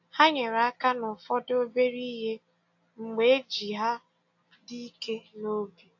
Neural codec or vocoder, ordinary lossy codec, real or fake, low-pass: none; AAC, 48 kbps; real; 7.2 kHz